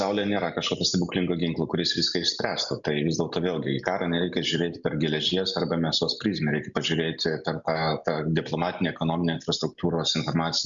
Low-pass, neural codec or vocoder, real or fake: 7.2 kHz; none; real